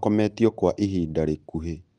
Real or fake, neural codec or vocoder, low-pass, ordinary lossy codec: real; none; 9.9 kHz; Opus, 32 kbps